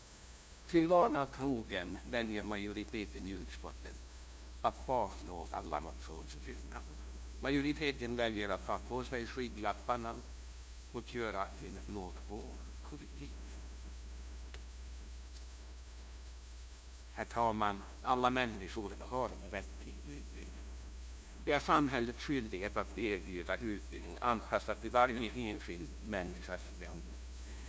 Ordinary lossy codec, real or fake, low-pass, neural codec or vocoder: none; fake; none; codec, 16 kHz, 0.5 kbps, FunCodec, trained on LibriTTS, 25 frames a second